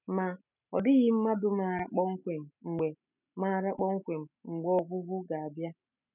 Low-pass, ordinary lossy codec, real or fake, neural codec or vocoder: 3.6 kHz; none; real; none